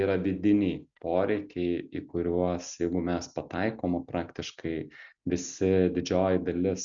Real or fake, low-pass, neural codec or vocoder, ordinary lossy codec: real; 7.2 kHz; none; Opus, 64 kbps